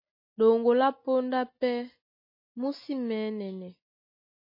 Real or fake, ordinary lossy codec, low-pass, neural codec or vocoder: real; MP3, 24 kbps; 5.4 kHz; none